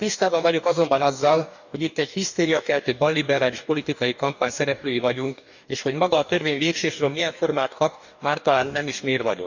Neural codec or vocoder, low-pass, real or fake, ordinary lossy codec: codec, 44.1 kHz, 2.6 kbps, DAC; 7.2 kHz; fake; none